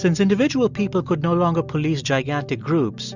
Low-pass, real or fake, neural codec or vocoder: 7.2 kHz; real; none